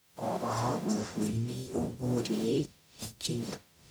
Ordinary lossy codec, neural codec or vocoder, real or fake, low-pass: none; codec, 44.1 kHz, 0.9 kbps, DAC; fake; none